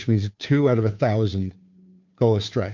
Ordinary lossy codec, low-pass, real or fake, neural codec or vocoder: MP3, 64 kbps; 7.2 kHz; fake; codec, 16 kHz, 2 kbps, FunCodec, trained on Chinese and English, 25 frames a second